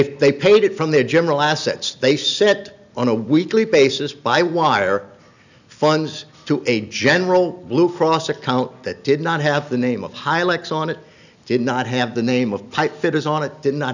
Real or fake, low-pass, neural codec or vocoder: real; 7.2 kHz; none